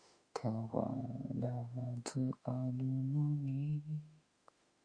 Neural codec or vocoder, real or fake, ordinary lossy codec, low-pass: autoencoder, 48 kHz, 32 numbers a frame, DAC-VAE, trained on Japanese speech; fake; Opus, 64 kbps; 9.9 kHz